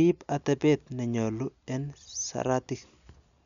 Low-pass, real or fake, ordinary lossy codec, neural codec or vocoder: 7.2 kHz; real; none; none